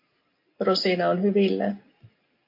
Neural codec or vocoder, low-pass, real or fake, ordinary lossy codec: none; 5.4 kHz; real; MP3, 32 kbps